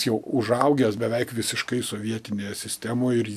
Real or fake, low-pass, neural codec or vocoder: real; 14.4 kHz; none